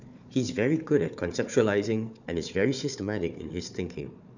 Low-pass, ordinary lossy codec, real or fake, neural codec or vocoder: 7.2 kHz; none; fake; codec, 16 kHz, 4 kbps, FunCodec, trained on Chinese and English, 50 frames a second